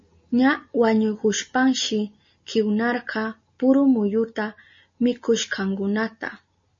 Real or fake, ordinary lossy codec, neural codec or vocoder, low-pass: real; MP3, 32 kbps; none; 7.2 kHz